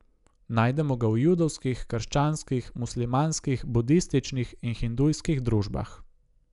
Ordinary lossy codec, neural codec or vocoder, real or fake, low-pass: none; none; real; 10.8 kHz